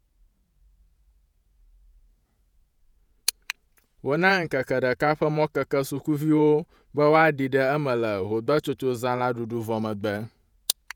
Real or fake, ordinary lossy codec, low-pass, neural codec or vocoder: fake; none; 19.8 kHz; vocoder, 48 kHz, 128 mel bands, Vocos